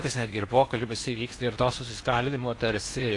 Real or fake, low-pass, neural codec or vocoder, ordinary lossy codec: fake; 10.8 kHz; codec, 16 kHz in and 24 kHz out, 0.8 kbps, FocalCodec, streaming, 65536 codes; AAC, 48 kbps